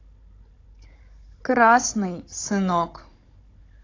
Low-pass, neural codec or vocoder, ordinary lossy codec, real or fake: 7.2 kHz; codec, 16 kHz, 16 kbps, FunCodec, trained on Chinese and English, 50 frames a second; AAC, 32 kbps; fake